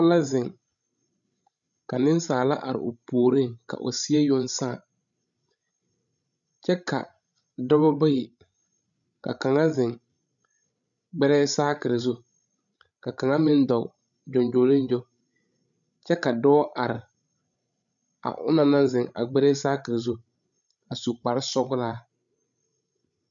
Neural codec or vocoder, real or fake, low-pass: codec, 16 kHz, 16 kbps, FreqCodec, larger model; fake; 7.2 kHz